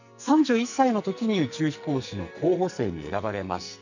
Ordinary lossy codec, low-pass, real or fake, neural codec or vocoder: none; 7.2 kHz; fake; codec, 44.1 kHz, 2.6 kbps, SNAC